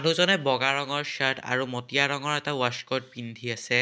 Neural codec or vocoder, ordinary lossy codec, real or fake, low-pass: none; none; real; none